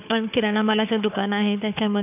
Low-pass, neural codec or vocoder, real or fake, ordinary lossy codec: 3.6 kHz; codec, 16 kHz, 4 kbps, FunCodec, trained on Chinese and English, 50 frames a second; fake; none